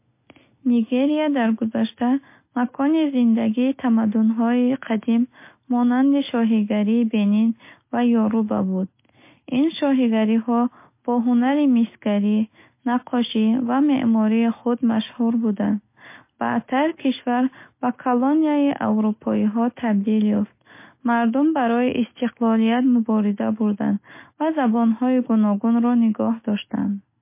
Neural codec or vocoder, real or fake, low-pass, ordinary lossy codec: none; real; 3.6 kHz; MP3, 24 kbps